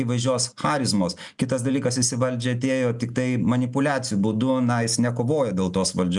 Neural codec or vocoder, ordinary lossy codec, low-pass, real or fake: none; MP3, 96 kbps; 10.8 kHz; real